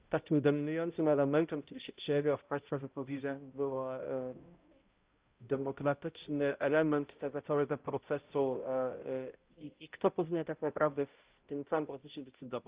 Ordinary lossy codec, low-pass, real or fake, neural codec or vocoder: Opus, 32 kbps; 3.6 kHz; fake; codec, 16 kHz, 0.5 kbps, X-Codec, HuBERT features, trained on balanced general audio